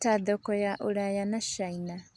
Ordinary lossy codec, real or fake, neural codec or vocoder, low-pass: none; real; none; none